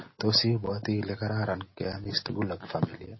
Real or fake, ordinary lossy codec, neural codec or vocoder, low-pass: real; MP3, 24 kbps; none; 7.2 kHz